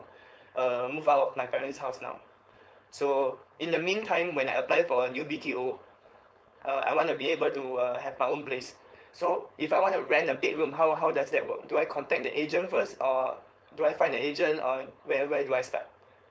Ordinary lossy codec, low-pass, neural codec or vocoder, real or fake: none; none; codec, 16 kHz, 4.8 kbps, FACodec; fake